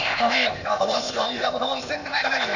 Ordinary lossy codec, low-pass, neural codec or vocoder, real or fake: none; 7.2 kHz; codec, 16 kHz, 0.8 kbps, ZipCodec; fake